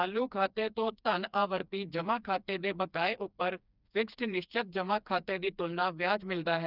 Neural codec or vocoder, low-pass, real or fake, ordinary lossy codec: codec, 16 kHz, 2 kbps, FreqCodec, smaller model; 5.4 kHz; fake; none